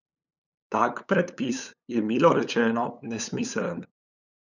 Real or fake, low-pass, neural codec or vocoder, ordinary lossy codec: fake; 7.2 kHz; codec, 16 kHz, 8 kbps, FunCodec, trained on LibriTTS, 25 frames a second; none